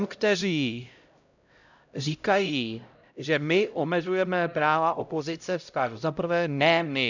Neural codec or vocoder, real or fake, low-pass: codec, 16 kHz, 0.5 kbps, X-Codec, HuBERT features, trained on LibriSpeech; fake; 7.2 kHz